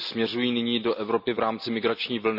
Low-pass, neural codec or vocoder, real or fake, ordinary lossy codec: 5.4 kHz; none; real; none